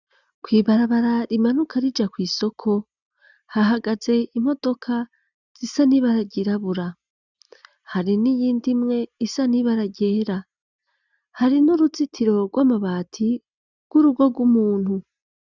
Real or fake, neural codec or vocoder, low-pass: real; none; 7.2 kHz